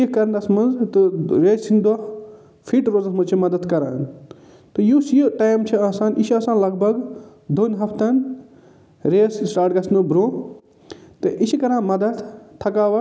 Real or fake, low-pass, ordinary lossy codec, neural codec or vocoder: real; none; none; none